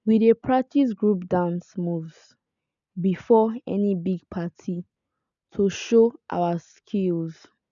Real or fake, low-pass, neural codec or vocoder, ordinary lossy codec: real; 7.2 kHz; none; none